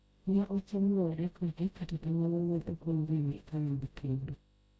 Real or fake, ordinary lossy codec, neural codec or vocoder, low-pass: fake; none; codec, 16 kHz, 0.5 kbps, FreqCodec, smaller model; none